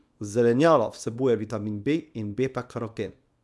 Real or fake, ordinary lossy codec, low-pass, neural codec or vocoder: fake; none; none; codec, 24 kHz, 0.9 kbps, WavTokenizer, small release